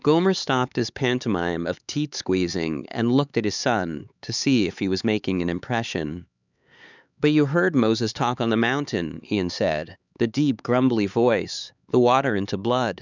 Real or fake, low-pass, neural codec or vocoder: fake; 7.2 kHz; codec, 16 kHz, 4 kbps, X-Codec, HuBERT features, trained on LibriSpeech